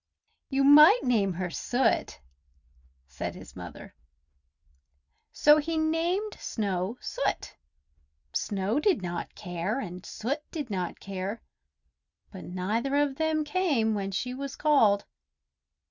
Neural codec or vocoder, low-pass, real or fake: none; 7.2 kHz; real